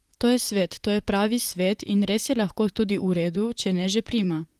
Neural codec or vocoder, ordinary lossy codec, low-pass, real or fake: vocoder, 44.1 kHz, 128 mel bands, Pupu-Vocoder; Opus, 24 kbps; 14.4 kHz; fake